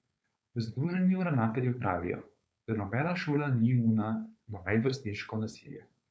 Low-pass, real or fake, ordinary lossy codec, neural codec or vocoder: none; fake; none; codec, 16 kHz, 4.8 kbps, FACodec